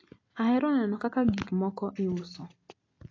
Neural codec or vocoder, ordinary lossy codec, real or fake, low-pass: none; AAC, 32 kbps; real; 7.2 kHz